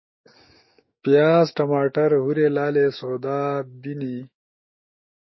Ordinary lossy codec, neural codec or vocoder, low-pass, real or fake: MP3, 24 kbps; autoencoder, 48 kHz, 128 numbers a frame, DAC-VAE, trained on Japanese speech; 7.2 kHz; fake